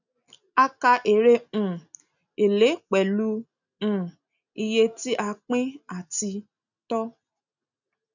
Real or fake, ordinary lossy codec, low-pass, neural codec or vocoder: real; none; 7.2 kHz; none